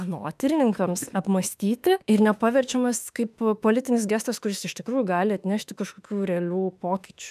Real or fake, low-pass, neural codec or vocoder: fake; 14.4 kHz; autoencoder, 48 kHz, 32 numbers a frame, DAC-VAE, trained on Japanese speech